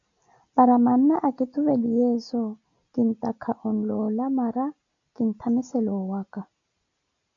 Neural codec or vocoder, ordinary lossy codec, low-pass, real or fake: none; MP3, 96 kbps; 7.2 kHz; real